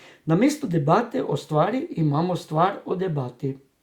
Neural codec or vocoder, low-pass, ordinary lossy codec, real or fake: none; 19.8 kHz; Opus, 64 kbps; real